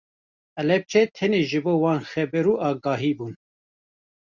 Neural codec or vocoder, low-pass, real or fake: none; 7.2 kHz; real